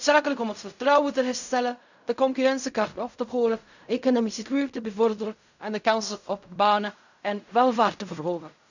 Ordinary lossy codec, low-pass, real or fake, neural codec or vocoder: none; 7.2 kHz; fake; codec, 16 kHz in and 24 kHz out, 0.4 kbps, LongCat-Audio-Codec, fine tuned four codebook decoder